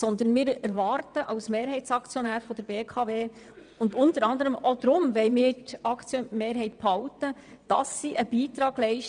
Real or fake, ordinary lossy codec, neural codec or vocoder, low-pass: fake; none; vocoder, 22.05 kHz, 80 mel bands, WaveNeXt; 9.9 kHz